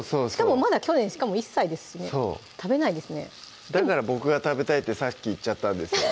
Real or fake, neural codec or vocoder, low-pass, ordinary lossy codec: real; none; none; none